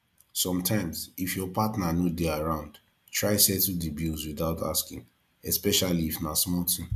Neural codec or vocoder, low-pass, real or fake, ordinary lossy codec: none; 14.4 kHz; real; MP3, 96 kbps